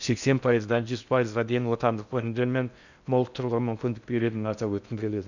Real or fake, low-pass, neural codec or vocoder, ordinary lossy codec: fake; 7.2 kHz; codec, 16 kHz in and 24 kHz out, 0.6 kbps, FocalCodec, streaming, 4096 codes; none